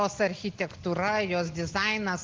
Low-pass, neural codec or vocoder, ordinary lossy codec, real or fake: 7.2 kHz; none; Opus, 16 kbps; real